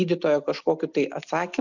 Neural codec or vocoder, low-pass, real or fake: none; 7.2 kHz; real